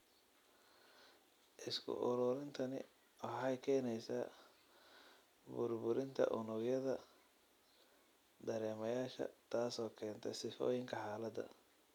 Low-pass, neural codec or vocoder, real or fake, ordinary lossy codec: 19.8 kHz; none; real; none